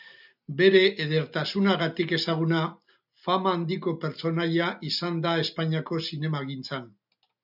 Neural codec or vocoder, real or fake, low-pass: none; real; 5.4 kHz